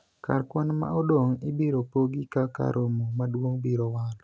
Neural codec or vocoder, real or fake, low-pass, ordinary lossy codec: none; real; none; none